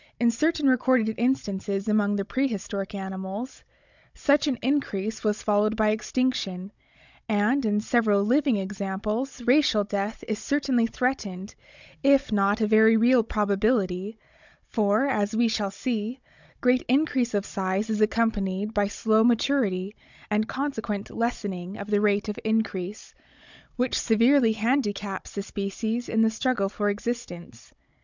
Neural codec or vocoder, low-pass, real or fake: codec, 16 kHz, 16 kbps, FunCodec, trained on Chinese and English, 50 frames a second; 7.2 kHz; fake